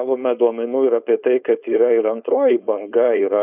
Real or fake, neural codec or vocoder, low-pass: fake; codec, 16 kHz, 4.8 kbps, FACodec; 3.6 kHz